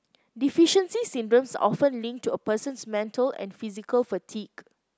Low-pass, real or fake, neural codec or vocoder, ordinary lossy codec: none; real; none; none